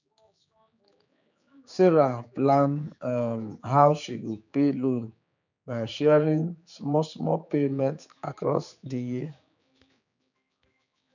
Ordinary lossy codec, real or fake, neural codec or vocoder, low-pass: none; fake; codec, 16 kHz, 4 kbps, X-Codec, HuBERT features, trained on general audio; 7.2 kHz